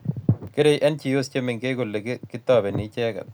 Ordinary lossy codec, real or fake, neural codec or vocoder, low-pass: none; real; none; none